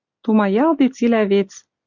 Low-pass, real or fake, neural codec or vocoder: 7.2 kHz; real; none